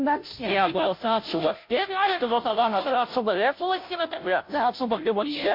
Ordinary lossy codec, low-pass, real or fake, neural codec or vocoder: MP3, 32 kbps; 5.4 kHz; fake; codec, 16 kHz, 0.5 kbps, FunCodec, trained on Chinese and English, 25 frames a second